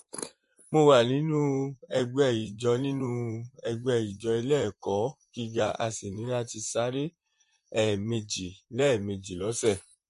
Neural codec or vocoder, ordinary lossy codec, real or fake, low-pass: vocoder, 44.1 kHz, 128 mel bands, Pupu-Vocoder; MP3, 48 kbps; fake; 14.4 kHz